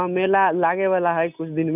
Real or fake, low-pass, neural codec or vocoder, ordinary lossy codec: real; 3.6 kHz; none; none